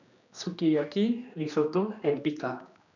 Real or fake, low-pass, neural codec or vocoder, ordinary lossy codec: fake; 7.2 kHz; codec, 16 kHz, 2 kbps, X-Codec, HuBERT features, trained on general audio; none